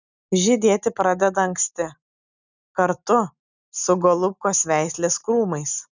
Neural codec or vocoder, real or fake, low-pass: none; real; 7.2 kHz